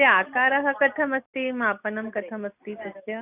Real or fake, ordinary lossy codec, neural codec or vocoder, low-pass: real; none; none; 3.6 kHz